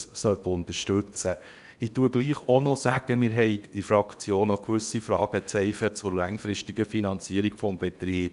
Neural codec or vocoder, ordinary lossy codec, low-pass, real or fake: codec, 16 kHz in and 24 kHz out, 0.8 kbps, FocalCodec, streaming, 65536 codes; none; 10.8 kHz; fake